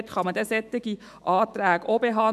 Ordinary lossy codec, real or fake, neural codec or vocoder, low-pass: MP3, 96 kbps; fake; autoencoder, 48 kHz, 128 numbers a frame, DAC-VAE, trained on Japanese speech; 14.4 kHz